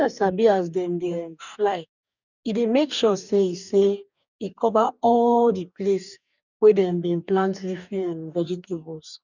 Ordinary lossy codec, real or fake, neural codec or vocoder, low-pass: none; fake; codec, 44.1 kHz, 2.6 kbps, DAC; 7.2 kHz